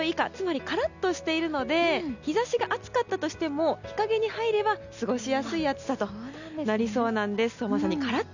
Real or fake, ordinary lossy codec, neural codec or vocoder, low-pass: real; none; none; 7.2 kHz